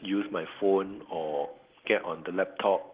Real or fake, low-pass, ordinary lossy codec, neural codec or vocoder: real; 3.6 kHz; Opus, 16 kbps; none